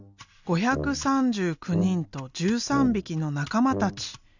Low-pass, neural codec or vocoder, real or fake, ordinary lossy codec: 7.2 kHz; none; real; none